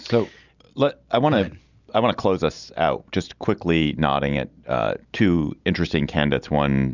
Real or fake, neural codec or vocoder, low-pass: real; none; 7.2 kHz